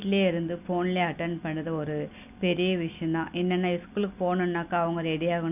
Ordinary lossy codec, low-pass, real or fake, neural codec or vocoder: none; 3.6 kHz; real; none